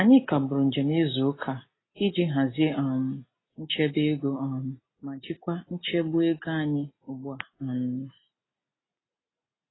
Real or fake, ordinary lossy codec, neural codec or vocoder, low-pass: real; AAC, 16 kbps; none; 7.2 kHz